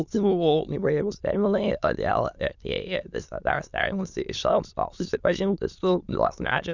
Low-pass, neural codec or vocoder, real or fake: 7.2 kHz; autoencoder, 22.05 kHz, a latent of 192 numbers a frame, VITS, trained on many speakers; fake